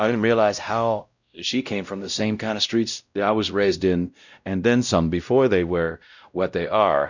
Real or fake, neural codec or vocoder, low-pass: fake; codec, 16 kHz, 0.5 kbps, X-Codec, WavLM features, trained on Multilingual LibriSpeech; 7.2 kHz